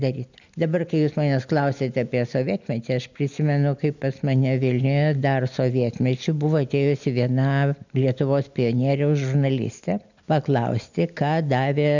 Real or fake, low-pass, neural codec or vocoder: real; 7.2 kHz; none